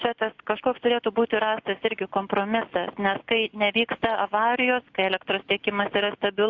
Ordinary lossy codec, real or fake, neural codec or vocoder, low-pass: AAC, 48 kbps; real; none; 7.2 kHz